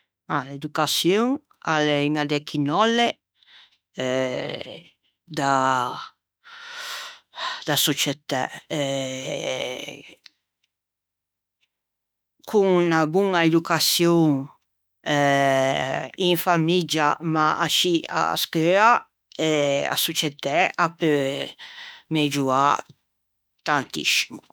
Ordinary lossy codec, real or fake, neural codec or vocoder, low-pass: none; fake; autoencoder, 48 kHz, 32 numbers a frame, DAC-VAE, trained on Japanese speech; none